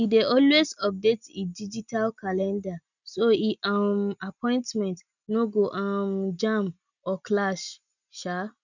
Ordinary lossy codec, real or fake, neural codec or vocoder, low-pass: none; real; none; 7.2 kHz